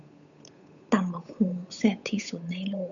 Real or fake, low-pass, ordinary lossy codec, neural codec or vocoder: fake; 7.2 kHz; none; codec, 16 kHz, 8 kbps, FunCodec, trained on Chinese and English, 25 frames a second